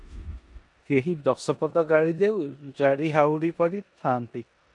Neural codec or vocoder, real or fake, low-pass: codec, 16 kHz in and 24 kHz out, 0.9 kbps, LongCat-Audio-Codec, four codebook decoder; fake; 10.8 kHz